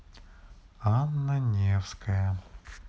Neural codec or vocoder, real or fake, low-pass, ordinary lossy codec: none; real; none; none